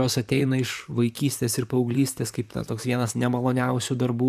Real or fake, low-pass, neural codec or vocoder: fake; 14.4 kHz; vocoder, 44.1 kHz, 128 mel bands, Pupu-Vocoder